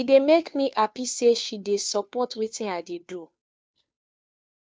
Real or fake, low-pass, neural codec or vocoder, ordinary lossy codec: fake; none; codec, 16 kHz, 2 kbps, FunCodec, trained on Chinese and English, 25 frames a second; none